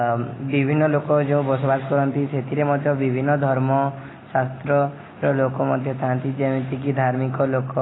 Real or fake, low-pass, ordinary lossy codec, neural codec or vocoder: real; 7.2 kHz; AAC, 16 kbps; none